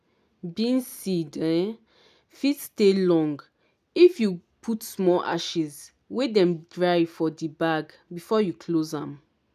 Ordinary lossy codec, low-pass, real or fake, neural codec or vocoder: none; 14.4 kHz; real; none